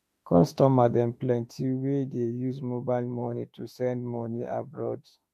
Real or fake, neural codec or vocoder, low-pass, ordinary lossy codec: fake; autoencoder, 48 kHz, 32 numbers a frame, DAC-VAE, trained on Japanese speech; 14.4 kHz; MP3, 64 kbps